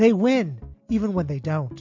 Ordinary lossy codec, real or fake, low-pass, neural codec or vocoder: MP3, 64 kbps; real; 7.2 kHz; none